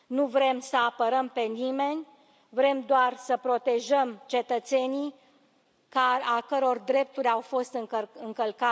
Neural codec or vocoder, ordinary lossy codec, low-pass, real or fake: none; none; none; real